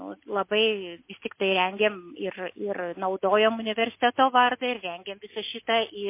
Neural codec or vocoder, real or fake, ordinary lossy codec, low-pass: none; real; MP3, 24 kbps; 3.6 kHz